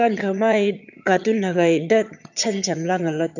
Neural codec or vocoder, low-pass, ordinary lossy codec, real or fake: vocoder, 22.05 kHz, 80 mel bands, HiFi-GAN; 7.2 kHz; none; fake